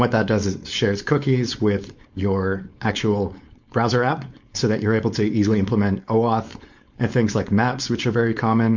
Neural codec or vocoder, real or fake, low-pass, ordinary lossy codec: codec, 16 kHz, 4.8 kbps, FACodec; fake; 7.2 kHz; MP3, 48 kbps